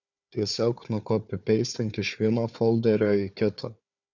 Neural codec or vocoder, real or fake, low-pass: codec, 16 kHz, 4 kbps, FunCodec, trained on Chinese and English, 50 frames a second; fake; 7.2 kHz